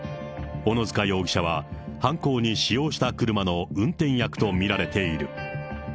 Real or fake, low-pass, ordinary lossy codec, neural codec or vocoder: real; none; none; none